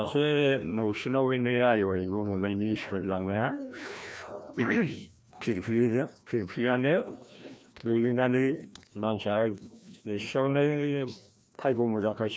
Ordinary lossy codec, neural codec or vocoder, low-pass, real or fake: none; codec, 16 kHz, 1 kbps, FreqCodec, larger model; none; fake